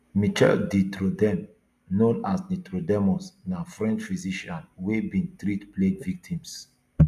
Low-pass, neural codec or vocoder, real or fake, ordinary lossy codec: 14.4 kHz; none; real; none